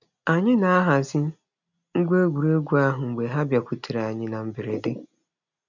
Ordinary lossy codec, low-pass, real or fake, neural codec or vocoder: none; 7.2 kHz; real; none